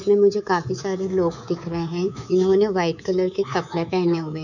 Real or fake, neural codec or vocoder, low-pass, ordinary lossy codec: fake; codec, 24 kHz, 3.1 kbps, DualCodec; 7.2 kHz; none